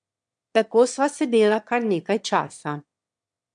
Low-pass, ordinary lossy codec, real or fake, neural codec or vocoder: 9.9 kHz; MP3, 64 kbps; fake; autoencoder, 22.05 kHz, a latent of 192 numbers a frame, VITS, trained on one speaker